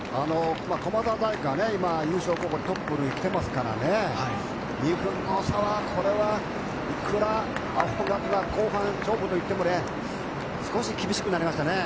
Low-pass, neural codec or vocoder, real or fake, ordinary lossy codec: none; none; real; none